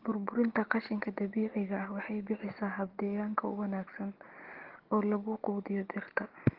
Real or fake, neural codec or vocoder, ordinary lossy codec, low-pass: real; none; Opus, 16 kbps; 5.4 kHz